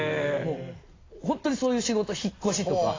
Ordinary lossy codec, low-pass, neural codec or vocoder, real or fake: AAC, 32 kbps; 7.2 kHz; none; real